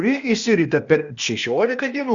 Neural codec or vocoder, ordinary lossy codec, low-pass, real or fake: codec, 16 kHz, 0.8 kbps, ZipCodec; Opus, 64 kbps; 7.2 kHz; fake